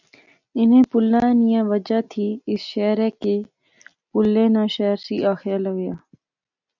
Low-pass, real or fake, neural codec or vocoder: 7.2 kHz; real; none